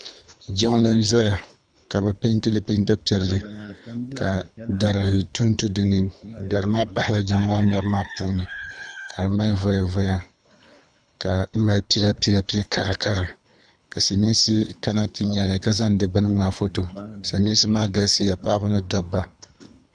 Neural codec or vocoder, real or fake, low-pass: codec, 24 kHz, 3 kbps, HILCodec; fake; 9.9 kHz